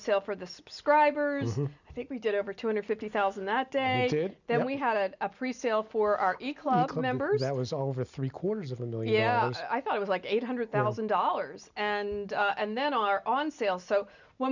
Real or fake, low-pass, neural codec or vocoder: real; 7.2 kHz; none